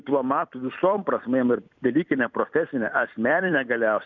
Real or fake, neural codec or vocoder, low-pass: fake; vocoder, 44.1 kHz, 128 mel bands every 256 samples, BigVGAN v2; 7.2 kHz